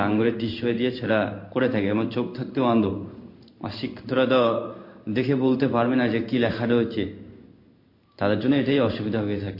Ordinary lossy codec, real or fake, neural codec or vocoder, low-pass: MP3, 32 kbps; fake; codec, 16 kHz in and 24 kHz out, 1 kbps, XY-Tokenizer; 5.4 kHz